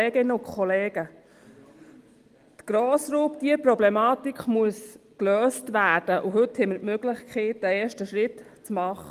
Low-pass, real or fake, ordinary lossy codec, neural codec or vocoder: 14.4 kHz; real; Opus, 24 kbps; none